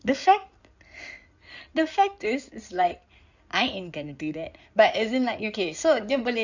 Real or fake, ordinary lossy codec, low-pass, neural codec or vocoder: fake; none; 7.2 kHz; codec, 16 kHz in and 24 kHz out, 2.2 kbps, FireRedTTS-2 codec